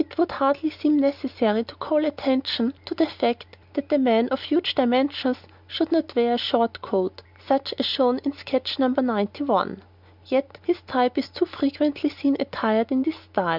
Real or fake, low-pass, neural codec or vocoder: real; 5.4 kHz; none